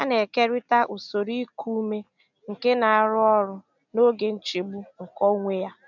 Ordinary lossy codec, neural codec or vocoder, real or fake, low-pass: none; none; real; 7.2 kHz